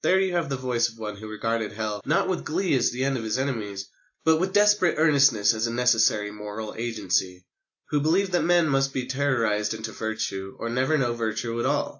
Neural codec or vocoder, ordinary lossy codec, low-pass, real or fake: none; AAC, 48 kbps; 7.2 kHz; real